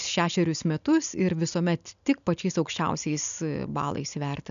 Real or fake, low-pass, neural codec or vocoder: real; 7.2 kHz; none